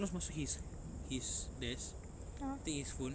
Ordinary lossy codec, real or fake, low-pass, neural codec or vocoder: none; real; none; none